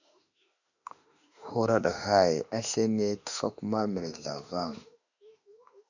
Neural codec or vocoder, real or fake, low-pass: autoencoder, 48 kHz, 32 numbers a frame, DAC-VAE, trained on Japanese speech; fake; 7.2 kHz